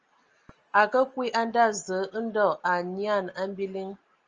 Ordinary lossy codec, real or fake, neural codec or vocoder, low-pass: Opus, 24 kbps; real; none; 7.2 kHz